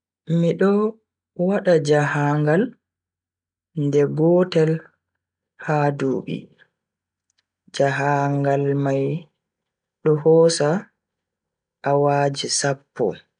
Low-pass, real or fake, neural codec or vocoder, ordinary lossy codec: 9.9 kHz; real; none; none